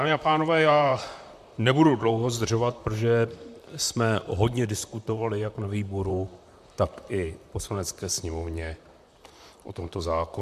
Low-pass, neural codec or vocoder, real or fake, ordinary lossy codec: 14.4 kHz; vocoder, 44.1 kHz, 128 mel bands, Pupu-Vocoder; fake; MP3, 96 kbps